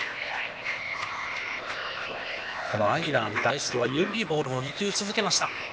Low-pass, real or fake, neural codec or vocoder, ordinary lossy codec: none; fake; codec, 16 kHz, 0.8 kbps, ZipCodec; none